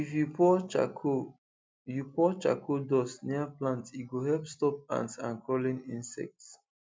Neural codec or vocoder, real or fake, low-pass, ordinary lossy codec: none; real; none; none